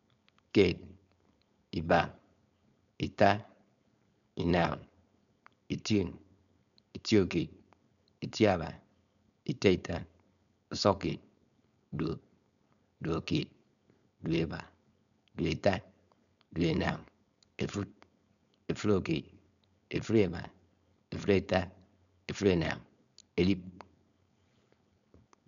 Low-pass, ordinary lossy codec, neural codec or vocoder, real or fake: 7.2 kHz; none; codec, 16 kHz, 4.8 kbps, FACodec; fake